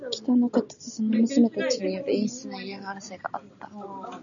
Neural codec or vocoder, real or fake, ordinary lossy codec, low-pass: none; real; MP3, 96 kbps; 7.2 kHz